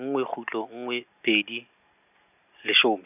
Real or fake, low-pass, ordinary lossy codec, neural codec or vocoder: real; 3.6 kHz; none; none